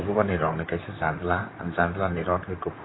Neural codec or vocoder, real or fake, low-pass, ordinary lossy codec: vocoder, 44.1 kHz, 128 mel bands, Pupu-Vocoder; fake; 7.2 kHz; AAC, 16 kbps